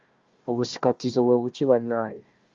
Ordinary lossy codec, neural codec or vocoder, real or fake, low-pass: Opus, 32 kbps; codec, 16 kHz, 1 kbps, FunCodec, trained on Chinese and English, 50 frames a second; fake; 7.2 kHz